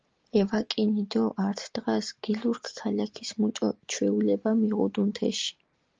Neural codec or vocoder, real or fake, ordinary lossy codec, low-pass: none; real; Opus, 24 kbps; 7.2 kHz